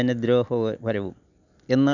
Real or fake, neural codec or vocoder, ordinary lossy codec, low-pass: real; none; none; 7.2 kHz